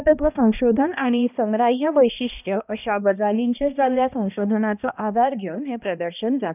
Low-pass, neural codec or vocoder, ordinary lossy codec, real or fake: 3.6 kHz; codec, 16 kHz, 1 kbps, X-Codec, HuBERT features, trained on balanced general audio; none; fake